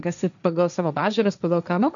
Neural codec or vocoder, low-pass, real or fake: codec, 16 kHz, 1.1 kbps, Voila-Tokenizer; 7.2 kHz; fake